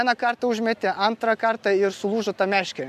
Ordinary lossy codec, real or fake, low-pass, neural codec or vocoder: AAC, 96 kbps; real; 14.4 kHz; none